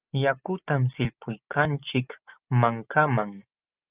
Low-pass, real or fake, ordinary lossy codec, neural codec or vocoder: 3.6 kHz; real; Opus, 32 kbps; none